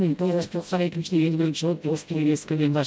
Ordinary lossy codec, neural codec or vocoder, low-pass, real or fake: none; codec, 16 kHz, 0.5 kbps, FreqCodec, smaller model; none; fake